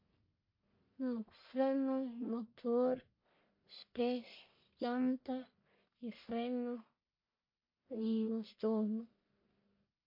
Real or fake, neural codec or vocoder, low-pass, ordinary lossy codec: fake; codec, 44.1 kHz, 1.7 kbps, Pupu-Codec; 5.4 kHz; MP3, 32 kbps